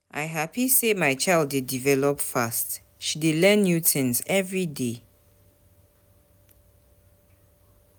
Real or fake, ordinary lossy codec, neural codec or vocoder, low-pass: real; none; none; none